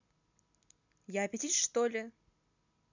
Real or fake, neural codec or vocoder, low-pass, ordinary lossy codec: real; none; 7.2 kHz; none